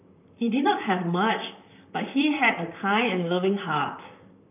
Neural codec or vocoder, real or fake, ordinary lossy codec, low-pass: vocoder, 44.1 kHz, 128 mel bands, Pupu-Vocoder; fake; none; 3.6 kHz